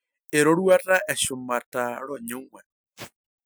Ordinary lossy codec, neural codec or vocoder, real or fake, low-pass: none; none; real; none